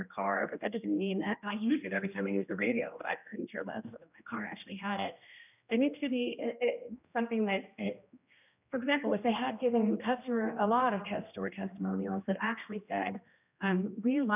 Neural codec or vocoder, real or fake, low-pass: codec, 16 kHz, 1 kbps, X-Codec, HuBERT features, trained on general audio; fake; 3.6 kHz